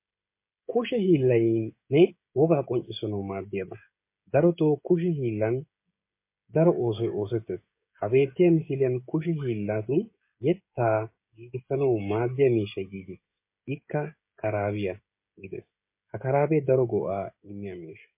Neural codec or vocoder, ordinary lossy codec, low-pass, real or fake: codec, 16 kHz, 16 kbps, FreqCodec, smaller model; MP3, 24 kbps; 3.6 kHz; fake